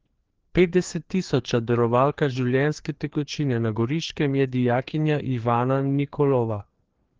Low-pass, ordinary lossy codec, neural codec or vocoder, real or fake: 7.2 kHz; Opus, 16 kbps; codec, 16 kHz, 2 kbps, FreqCodec, larger model; fake